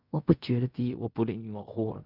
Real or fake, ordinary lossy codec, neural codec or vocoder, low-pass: fake; none; codec, 16 kHz in and 24 kHz out, 0.4 kbps, LongCat-Audio-Codec, fine tuned four codebook decoder; 5.4 kHz